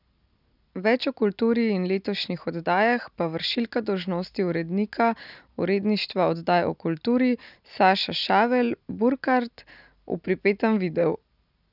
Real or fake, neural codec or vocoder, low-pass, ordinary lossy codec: real; none; 5.4 kHz; none